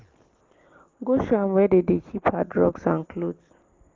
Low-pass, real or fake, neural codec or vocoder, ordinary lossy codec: 7.2 kHz; real; none; Opus, 16 kbps